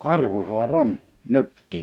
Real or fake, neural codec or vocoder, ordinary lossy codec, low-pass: fake; codec, 44.1 kHz, 2.6 kbps, DAC; none; 19.8 kHz